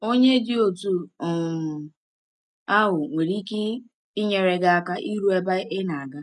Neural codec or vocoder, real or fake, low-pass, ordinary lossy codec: none; real; 10.8 kHz; none